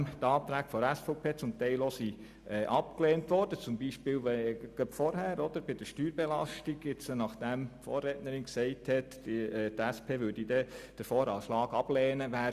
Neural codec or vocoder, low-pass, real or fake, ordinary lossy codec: none; 14.4 kHz; real; Opus, 64 kbps